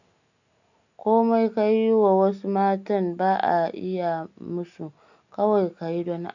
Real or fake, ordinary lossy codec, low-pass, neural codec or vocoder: real; none; 7.2 kHz; none